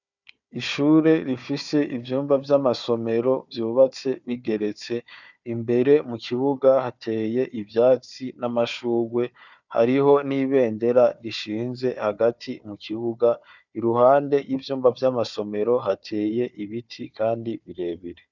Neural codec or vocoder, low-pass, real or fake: codec, 16 kHz, 4 kbps, FunCodec, trained on Chinese and English, 50 frames a second; 7.2 kHz; fake